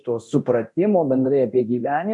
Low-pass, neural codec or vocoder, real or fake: 10.8 kHz; codec, 24 kHz, 0.9 kbps, DualCodec; fake